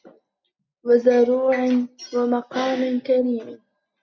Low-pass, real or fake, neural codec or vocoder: 7.2 kHz; real; none